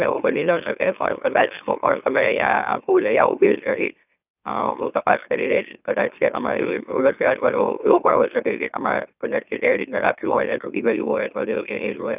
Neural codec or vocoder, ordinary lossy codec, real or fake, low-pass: autoencoder, 44.1 kHz, a latent of 192 numbers a frame, MeloTTS; none; fake; 3.6 kHz